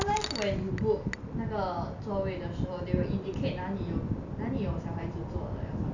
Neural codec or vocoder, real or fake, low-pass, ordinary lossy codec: none; real; 7.2 kHz; none